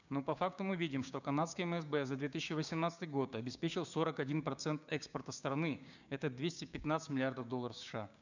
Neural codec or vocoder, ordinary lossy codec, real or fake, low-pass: codec, 16 kHz, 6 kbps, DAC; none; fake; 7.2 kHz